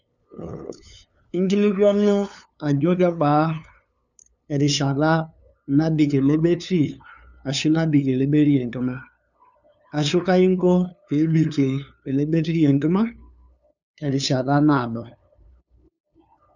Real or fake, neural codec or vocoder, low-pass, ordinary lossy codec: fake; codec, 16 kHz, 2 kbps, FunCodec, trained on LibriTTS, 25 frames a second; 7.2 kHz; none